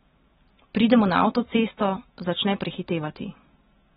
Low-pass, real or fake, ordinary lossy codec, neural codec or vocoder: 19.8 kHz; real; AAC, 16 kbps; none